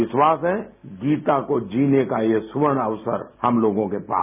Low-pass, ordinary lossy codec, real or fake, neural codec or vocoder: 3.6 kHz; none; real; none